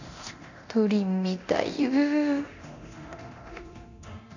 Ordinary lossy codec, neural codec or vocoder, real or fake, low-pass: none; codec, 24 kHz, 0.9 kbps, DualCodec; fake; 7.2 kHz